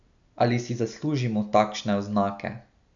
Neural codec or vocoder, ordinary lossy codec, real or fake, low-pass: none; none; real; 7.2 kHz